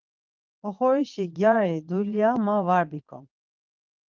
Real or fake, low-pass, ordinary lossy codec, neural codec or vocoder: fake; 7.2 kHz; Opus, 32 kbps; vocoder, 22.05 kHz, 80 mel bands, Vocos